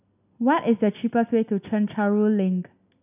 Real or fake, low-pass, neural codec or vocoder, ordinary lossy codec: real; 3.6 kHz; none; none